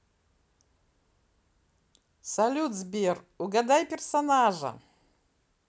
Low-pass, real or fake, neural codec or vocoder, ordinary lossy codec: none; real; none; none